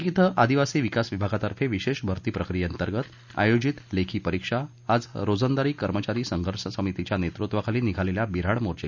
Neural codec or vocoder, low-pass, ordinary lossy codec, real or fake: none; 7.2 kHz; none; real